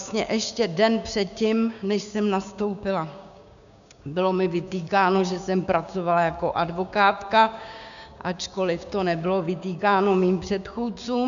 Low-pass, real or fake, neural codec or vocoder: 7.2 kHz; fake; codec, 16 kHz, 6 kbps, DAC